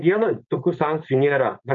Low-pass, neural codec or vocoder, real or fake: 7.2 kHz; codec, 16 kHz, 4.8 kbps, FACodec; fake